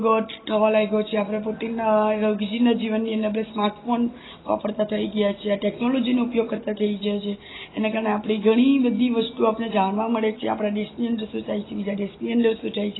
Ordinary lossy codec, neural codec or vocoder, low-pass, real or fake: AAC, 16 kbps; none; 7.2 kHz; real